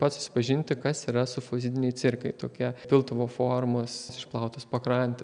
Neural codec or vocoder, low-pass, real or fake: none; 9.9 kHz; real